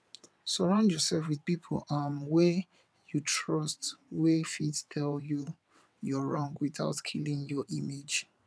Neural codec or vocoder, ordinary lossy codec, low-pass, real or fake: vocoder, 22.05 kHz, 80 mel bands, WaveNeXt; none; none; fake